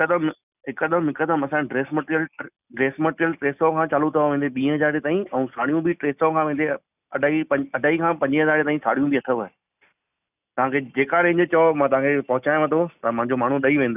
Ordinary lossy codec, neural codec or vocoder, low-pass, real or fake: none; none; 3.6 kHz; real